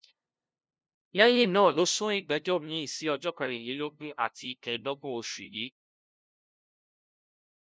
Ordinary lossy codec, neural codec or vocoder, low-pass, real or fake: none; codec, 16 kHz, 0.5 kbps, FunCodec, trained on LibriTTS, 25 frames a second; none; fake